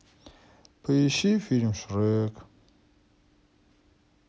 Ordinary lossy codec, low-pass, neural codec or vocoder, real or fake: none; none; none; real